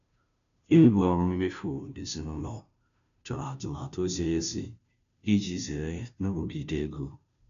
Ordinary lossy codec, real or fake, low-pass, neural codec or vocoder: none; fake; 7.2 kHz; codec, 16 kHz, 0.5 kbps, FunCodec, trained on Chinese and English, 25 frames a second